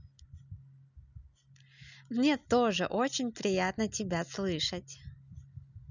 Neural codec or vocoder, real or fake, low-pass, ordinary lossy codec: none; real; 7.2 kHz; none